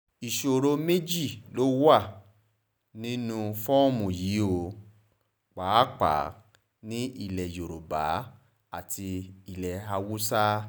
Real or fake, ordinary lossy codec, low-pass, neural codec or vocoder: real; none; none; none